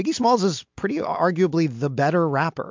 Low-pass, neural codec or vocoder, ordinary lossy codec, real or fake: 7.2 kHz; none; MP3, 64 kbps; real